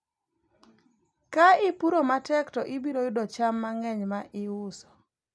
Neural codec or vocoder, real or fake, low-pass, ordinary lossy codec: none; real; none; none